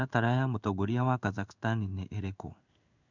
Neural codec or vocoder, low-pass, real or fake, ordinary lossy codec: codec, 16 kHz in and 24 kHz out, 1 kbps, XY-Tokenizer; 7.2 kHz; fake; none